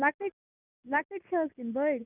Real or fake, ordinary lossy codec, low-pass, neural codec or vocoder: real; none; 3.6 kHz; none